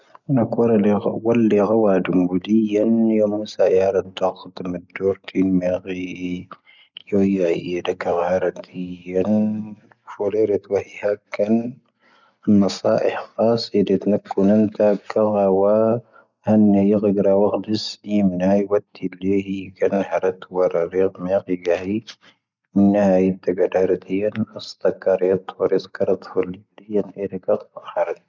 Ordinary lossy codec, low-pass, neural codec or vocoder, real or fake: none; 7.2 kHz; none; real